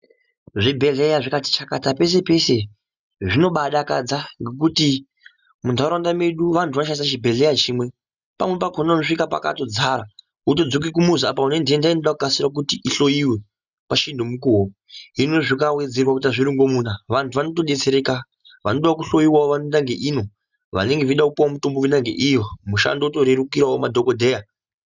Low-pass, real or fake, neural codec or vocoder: 7.2 kHz; real; none